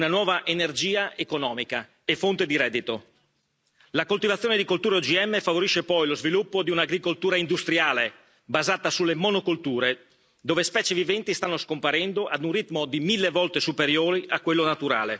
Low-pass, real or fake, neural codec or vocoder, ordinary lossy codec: none; real; none; none